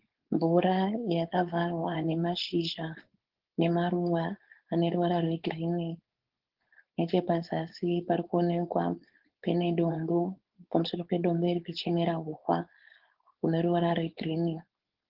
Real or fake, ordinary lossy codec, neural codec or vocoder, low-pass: fake; Opus, 16 kbps; codec, 16 kHz, 4.8 kbps, FACodec; 5.4 kHz